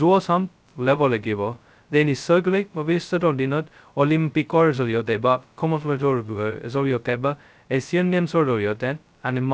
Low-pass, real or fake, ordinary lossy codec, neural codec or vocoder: none; fake; none; codec, 16 kHz, 0.2 kbps, FocalCodec